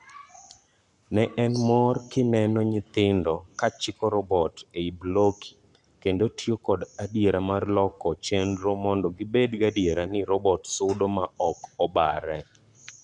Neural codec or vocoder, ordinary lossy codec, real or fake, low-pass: codec, 44.1 kHz, 7.8 kbps, Pupu-Codec; none; fake; 10.8 kHz